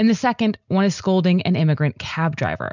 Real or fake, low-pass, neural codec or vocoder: real; 7.2 kHz; none